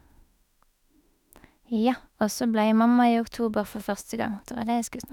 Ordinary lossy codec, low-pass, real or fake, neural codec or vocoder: none; 19.8 kHz; fake; autoencoder, 48 kHz, 32 numbers a frame, DAC-VAE, trained on Japanese speech